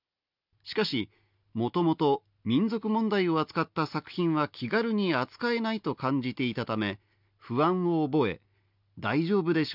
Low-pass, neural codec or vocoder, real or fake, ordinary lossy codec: 5.4 kHz; none; real; none